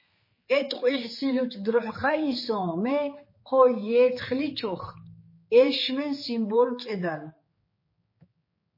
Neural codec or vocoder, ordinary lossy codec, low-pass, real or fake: codec, 16 kHz, 4 kbps, X-Codec, HuBERT features, trained on general audio; MP3, 24 kbps; 5.4 kHz; fake